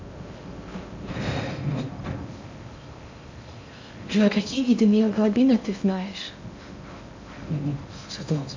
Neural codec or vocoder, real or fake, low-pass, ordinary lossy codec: codec, 16 kHz in and 24 kHz out, 0.6 kbps, FocalCodec, streaming, 4096 codes; fake; 7.2 kHz; MP3, 64 kbps